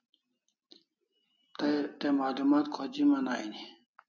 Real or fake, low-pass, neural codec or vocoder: real; 7.2 kHz; none